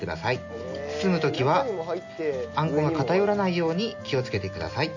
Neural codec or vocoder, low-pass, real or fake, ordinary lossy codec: none; 7.2 kHz; real; none